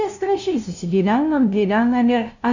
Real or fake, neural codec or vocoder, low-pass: fake; codec, 16 kHz, 0.5 kbps, FunCodec, trained on LibriTTS, 25 frames a second; 7.2 kHz